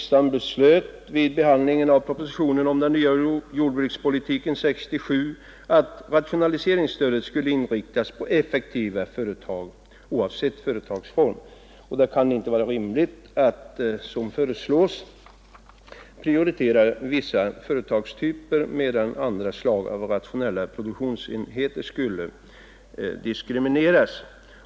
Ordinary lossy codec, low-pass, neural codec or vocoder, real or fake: none; none; none; real